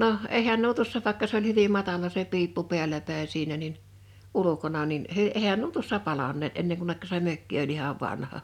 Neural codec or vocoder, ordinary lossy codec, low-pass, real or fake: none; none; 19.8 kHz; real